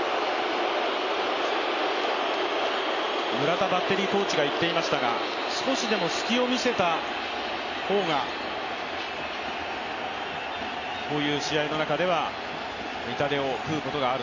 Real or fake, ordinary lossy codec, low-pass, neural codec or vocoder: real; none; 7.2 kHz; none